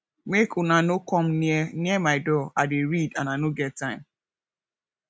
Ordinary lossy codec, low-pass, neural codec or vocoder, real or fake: none; none; none; real